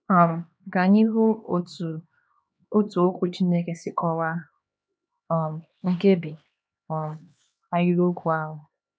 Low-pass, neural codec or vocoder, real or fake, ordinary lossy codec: none; codec, 16 kHz, 2 kbps, X-Codec, HuBERT features, trained on LibriSpeech; fake; none